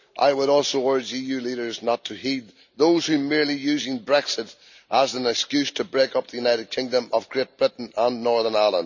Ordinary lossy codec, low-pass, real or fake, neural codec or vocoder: none; 7.2 kHz; real; none